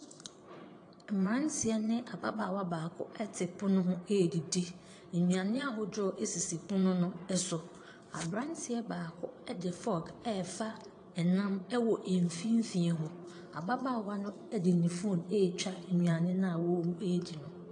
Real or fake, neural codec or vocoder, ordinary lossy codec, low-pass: fake; vocoder, 22.05 kHz, 80 mel bands, Vocos; AAC, 48 kbps; 9.9 kHz